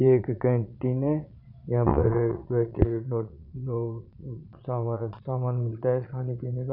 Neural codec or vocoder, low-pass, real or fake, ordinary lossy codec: vocoder, 22.05 kHz, 80 mel bands, Vocos; 5.4 kHz; fake; none